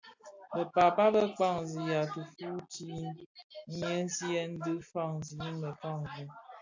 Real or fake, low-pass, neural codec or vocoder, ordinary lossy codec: real; 7.2 kHz; none; AAC, 48 kbps